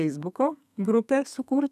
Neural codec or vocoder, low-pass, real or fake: codec, 32 kHz, 1.9 kbps, SNAC; 14.4 kHz; fake